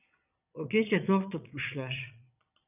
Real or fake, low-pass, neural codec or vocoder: fake; 3.6 kHz; vocoder, 22.05 kHz, 80 mel bands, Vocos